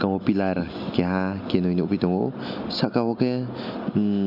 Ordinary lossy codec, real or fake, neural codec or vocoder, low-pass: none; real; none; 5.4 kHz